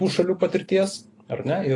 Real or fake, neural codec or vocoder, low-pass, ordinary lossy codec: real; none; 10.8 kHz; AAC, 32 kbps